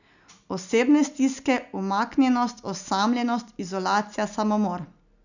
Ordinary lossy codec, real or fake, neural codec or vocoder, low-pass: none; real; none; 7.2 kHz